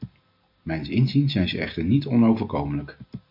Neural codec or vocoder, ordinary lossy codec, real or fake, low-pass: none; MP3, 48 kbps; real; 5.4 kHz